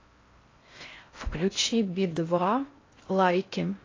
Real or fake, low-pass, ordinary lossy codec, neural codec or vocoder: fake; 7.2 kHz; AAC, 32 kbps; codec, 16 kHz in and 24 kHz out, 0.6 kbps, FocalCodec, streaming, 4096 codes